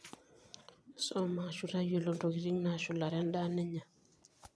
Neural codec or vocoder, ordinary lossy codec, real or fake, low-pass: vocoder, 22.05 kHz, 80 mel bands, Vocos; none; fake; none